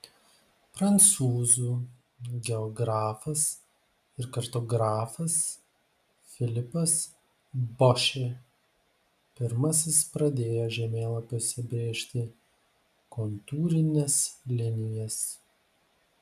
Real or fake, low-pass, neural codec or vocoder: real; 14.4 kHz; none